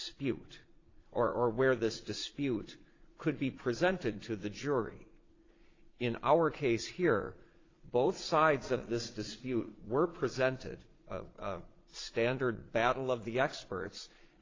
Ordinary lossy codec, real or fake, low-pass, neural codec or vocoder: AAC, 32 kbps; fake; 7.2 kHz; vocoder, 22.05 kHz, 80 mel bands, Vocos